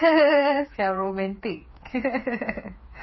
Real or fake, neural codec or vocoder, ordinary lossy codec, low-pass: fake; codec, 16 kHz, 4 kbps, FreqCodec, smaller model; MP3, 24 kbps; 7.2 kHz